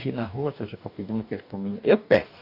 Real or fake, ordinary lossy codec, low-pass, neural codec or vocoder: fake; none; 5.4 kHz; codec, 44.1 kHz, 2.6 kbps, DAC